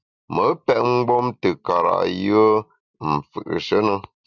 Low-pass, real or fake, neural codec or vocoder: 7.2 kHz; real; none